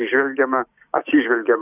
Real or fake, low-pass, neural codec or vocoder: fake; 3.6 kHz; codec, 16 kHz, 6 kbps, DAC